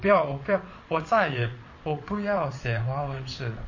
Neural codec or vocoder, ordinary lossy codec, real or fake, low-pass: vocoder, 22.05 kHz, 80 mel bands, WaveNeXt; MP3, 32 kbps; fake; 7.2 kHz